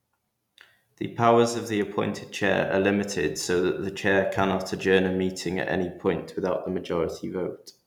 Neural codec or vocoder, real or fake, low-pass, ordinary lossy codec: none; real; 19.8 kHz; none